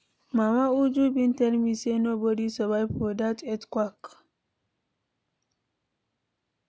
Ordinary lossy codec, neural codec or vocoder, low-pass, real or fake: none; none; none; real